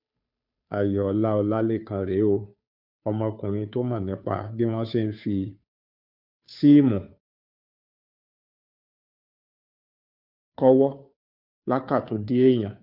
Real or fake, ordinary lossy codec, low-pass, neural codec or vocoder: fake; none; 5.4 kHz; codec, 16 kHz, 2 kbps, FunCodec, trained on Chinese and English, 25 frames a second